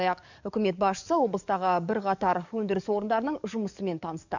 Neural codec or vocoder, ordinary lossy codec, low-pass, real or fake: codec, 44.1 kHz, 7.8 kbps, DAC; none; 7.2 kHz; fake